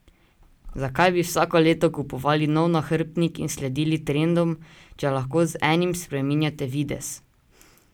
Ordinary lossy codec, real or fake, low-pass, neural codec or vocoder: none; real; none; none